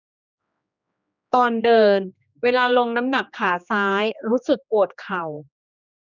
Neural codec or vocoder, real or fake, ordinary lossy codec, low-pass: codec, 16 kHz, 2 kbps, X-Codec, HuBERT features, trained on general audio; fake; none; 7.2 kHz